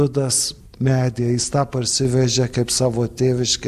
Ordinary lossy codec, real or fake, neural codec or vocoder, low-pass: AAC, 96 kbps; real; none; 14.4 kHz